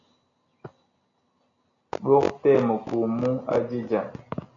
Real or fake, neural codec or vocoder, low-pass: real; none; 7.2 kHz